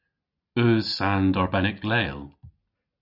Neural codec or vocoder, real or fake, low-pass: none; real; 5.4 kHz